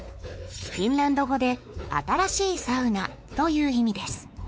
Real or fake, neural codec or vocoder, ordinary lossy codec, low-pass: fake; codec, 16 kHz, 4 kbps, X-Codec, WavLM features, trained on Multilingual LibriSpeech; none; none